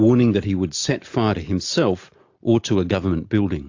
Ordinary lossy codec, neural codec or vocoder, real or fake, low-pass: AAC, 48 kbps; none; real; 7.2 kHz